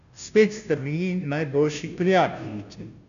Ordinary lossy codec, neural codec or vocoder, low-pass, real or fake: none; codec, 16 kHz, 0.5 kbps, FunCodec, trained on Chinese and English, 25 frames a second; 7.2 kHz; fake